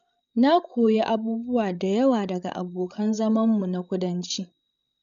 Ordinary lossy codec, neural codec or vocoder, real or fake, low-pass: MP3, 64 kbps; codec, 16 kHz, 16 kbps, FreqCodec, larger model; fake; 7.2 kHz